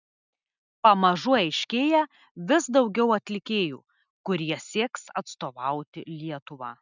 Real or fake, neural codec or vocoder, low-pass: real; none; 7.2 kHz